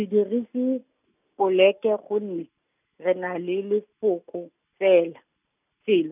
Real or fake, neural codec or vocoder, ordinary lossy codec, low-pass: real; none; none; 3.6 kHz